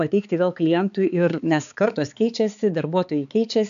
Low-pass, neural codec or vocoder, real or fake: 7.2 kHz; codec, 16 kHz, 4 kbps, X-Codec, HuBERT features, trained on balanced general audio; fake